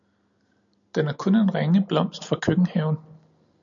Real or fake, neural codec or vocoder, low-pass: real; none; 7.2 kHz